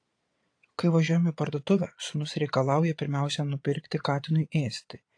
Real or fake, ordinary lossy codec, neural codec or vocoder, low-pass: fake; AAC, 48 kbps; vocoder, 22.05 kHz, 80 mel bands, Vocos; 9.9 kHz